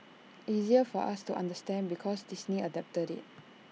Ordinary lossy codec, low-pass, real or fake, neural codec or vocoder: none; none; real; none